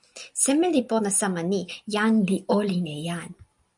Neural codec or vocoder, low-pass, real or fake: none; 10.8 kHz; real